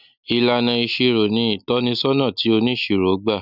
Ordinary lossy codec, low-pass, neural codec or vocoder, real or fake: none; 5.4 kHz; none; real